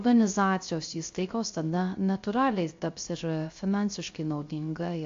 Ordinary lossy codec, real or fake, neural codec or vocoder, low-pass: AAC, 48 kbps; fake; codec, 16 kHz, 0.3 kbps, FocalCodec; 7.2 kHz